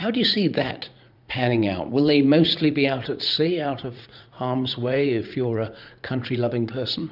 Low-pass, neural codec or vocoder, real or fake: 5.4 kHz; vocoder, 44.1 kHz, 80 mel bands, Vocos; fake